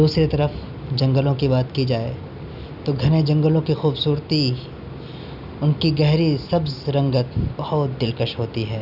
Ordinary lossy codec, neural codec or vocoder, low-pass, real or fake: none; none; 5.4 kHz; real